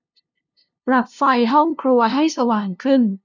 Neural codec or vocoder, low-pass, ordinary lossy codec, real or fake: codec, 16 kHz, 0.5 kbps, FunCodec, trained on LibriTTS, 25 frames a second; 7.2 kHz; none; fake